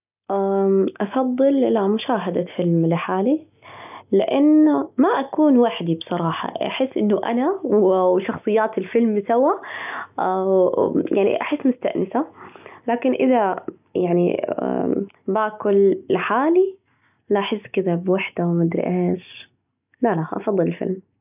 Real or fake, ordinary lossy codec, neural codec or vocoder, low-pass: real; none; none; 3.6 kHz